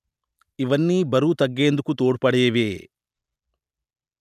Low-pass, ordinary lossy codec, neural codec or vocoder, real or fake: 14.4 kHz; none; none; real